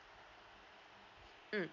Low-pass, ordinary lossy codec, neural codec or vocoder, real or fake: 7.2 kHz; none; none; real